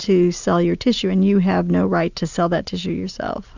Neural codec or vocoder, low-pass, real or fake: none; 7.2 kHz; real